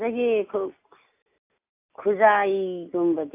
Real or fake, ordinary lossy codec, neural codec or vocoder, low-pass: real; AAC, 24 kbps; none; 3.6 kHz